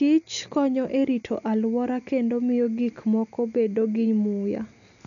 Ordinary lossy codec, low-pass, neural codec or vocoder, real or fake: none; 7.2 kHz; none; real